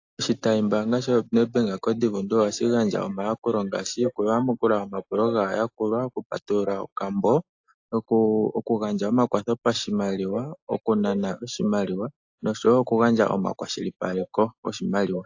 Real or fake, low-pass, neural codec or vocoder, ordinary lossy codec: real; 7.2 kHz; none; AAC, 48 kbps